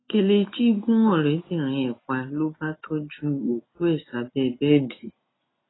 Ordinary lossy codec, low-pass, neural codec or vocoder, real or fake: AAC, 16 kbps; 7.2 kHz; none; real